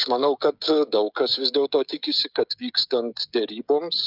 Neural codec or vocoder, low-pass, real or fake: codec, 16 kHz, 16 kbps, FreqCodec, smaller model; 5.4 kHz; fake